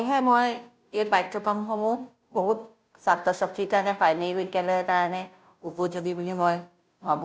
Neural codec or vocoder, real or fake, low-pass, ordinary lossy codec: codec, 16 kHz, 0.5 kbps, FunCodec, trained on Chinese and English, 25 frames a second; fake; none; none